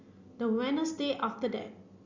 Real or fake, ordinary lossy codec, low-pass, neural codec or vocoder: real; Opus, 64 kbps; 7.2 kHz; none